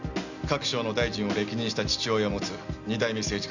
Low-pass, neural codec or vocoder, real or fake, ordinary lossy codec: 7.2 kHz; none; real; none